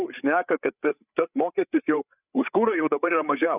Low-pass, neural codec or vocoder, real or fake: 3.6 kHz; codec, 16 kHz, 8 kbps, FreqCodec, larger model; fake